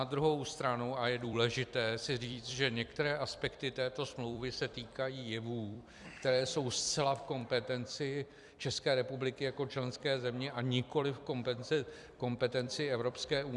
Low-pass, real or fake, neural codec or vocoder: 10.8 kHz; fake; vocoder, 44.1 kHz, 128 mel bands every 256 samples, BigVGAN v2